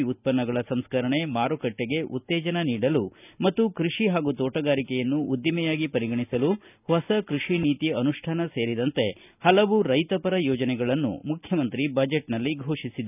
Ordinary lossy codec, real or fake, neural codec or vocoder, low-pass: none; real; none; 3.6 kHz